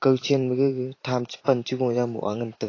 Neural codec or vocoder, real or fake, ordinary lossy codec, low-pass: vocoder, 44.1 kHz, 128 mel bands every 512 samples, BigVGAN v2; fake; AAC, 32 kbps; 7.2 kHz